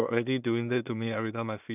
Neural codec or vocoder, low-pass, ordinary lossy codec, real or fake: codec, 16 kHz in and 24 kHz out, 0.4 kbps, LongCat-Audio-Codec, two codebook decoder; 3.6 kHz; none; fake